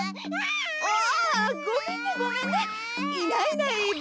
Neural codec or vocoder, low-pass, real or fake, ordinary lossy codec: none; none; real; none